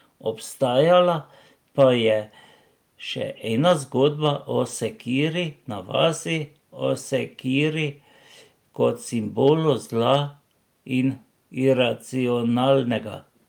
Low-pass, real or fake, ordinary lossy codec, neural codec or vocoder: 19.8 kHz; real; Opus, 32 kbps; none